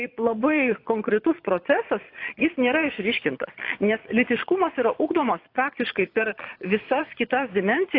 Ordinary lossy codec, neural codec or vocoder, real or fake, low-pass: AAC, 32 kbps; vocoder, 22.05 kHz, 80 mel bands, Vocos; fake; 5.4 kHz